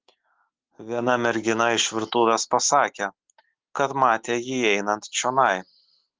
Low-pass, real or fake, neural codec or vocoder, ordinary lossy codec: 7.2 kHz; real; none; Opus, 16 kbps